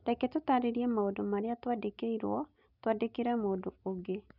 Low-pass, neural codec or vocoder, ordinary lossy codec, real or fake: 5.4 kHz; none; none; real